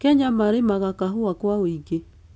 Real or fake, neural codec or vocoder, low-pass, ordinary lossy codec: real; none; none; none